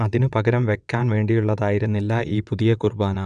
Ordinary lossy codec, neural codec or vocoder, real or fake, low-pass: none; vocoder, 22.05 kHz, 80 mel bands, WaveNeXt; fake; 9.9 kHz